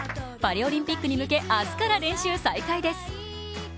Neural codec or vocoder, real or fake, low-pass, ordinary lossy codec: none; real; none; none